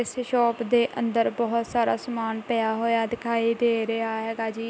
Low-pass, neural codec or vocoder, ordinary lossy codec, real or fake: none; none; none; real